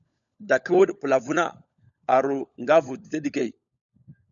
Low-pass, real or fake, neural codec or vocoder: 7.2 kHz; fake; codec, 16 kHz, 16 kbps, FunCodec, trained on LibriTTS, 50 frames a second